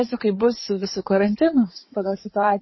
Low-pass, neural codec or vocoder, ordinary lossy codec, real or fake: 7.2 kHz; codec, 16 kHz in and 24 kHz out, 2.2 kbps, FireRedTTS-2 codec; MP3, 24 kbps; fake